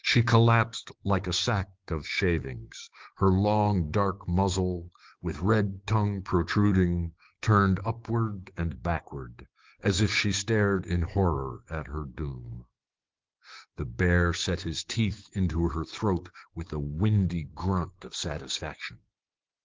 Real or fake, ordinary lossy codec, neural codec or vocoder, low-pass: fake; Opus, 16 kbps; codec, 16 kHz, 6 kbps, DAC; 7.2 kHz